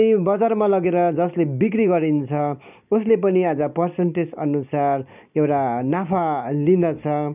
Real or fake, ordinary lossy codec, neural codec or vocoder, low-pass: real; none; none; 3.6 kHz